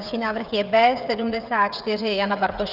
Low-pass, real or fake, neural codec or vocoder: 5.4 kHz; fake; codec, 16 kHz, 16 kbps, FreqCodec, smaller model